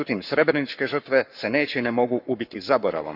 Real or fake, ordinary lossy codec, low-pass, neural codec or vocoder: fake; none; 5.4 kHz; codec, 44.1 kHz, 7.8 kbps, Pupu-Codec